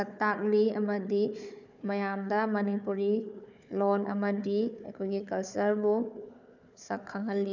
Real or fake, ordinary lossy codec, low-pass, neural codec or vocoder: fake; none; 7.2 kHz; codec, 16 kHz, 4 kbps, FunCodec, trained on Chinese and English, 50 frames a second